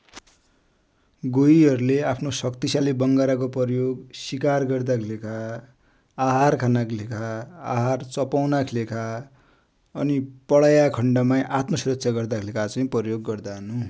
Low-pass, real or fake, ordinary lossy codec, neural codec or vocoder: none; real; none; none